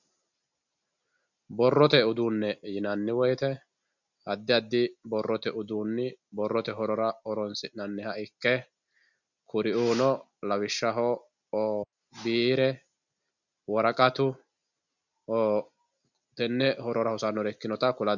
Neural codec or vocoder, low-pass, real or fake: none; 7.2 kHz; real